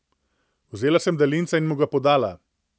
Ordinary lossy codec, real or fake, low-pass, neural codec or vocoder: none; real; none; none